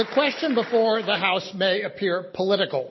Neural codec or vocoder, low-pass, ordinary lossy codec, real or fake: none; 7.2 kHz; MP3, 24 kbps; real